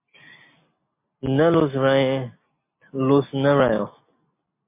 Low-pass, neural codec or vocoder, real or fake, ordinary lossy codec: 3.6 kHz; none; real; MP3, 24 kbps